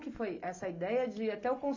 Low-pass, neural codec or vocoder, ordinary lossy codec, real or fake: 7.2 kHz; none; AAC, 48 kbps; real